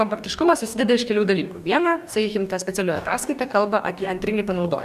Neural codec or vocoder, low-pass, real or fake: codec, 44.1 kHz, 2.6 kbps, DAC; 14.4 kHz; fake